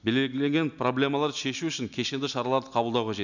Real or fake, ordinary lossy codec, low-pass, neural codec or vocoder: real; none; 7.2 kHz; none